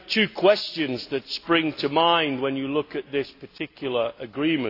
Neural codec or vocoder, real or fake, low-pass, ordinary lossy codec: none; real; 5.4 kHz; AAC, 32 kbps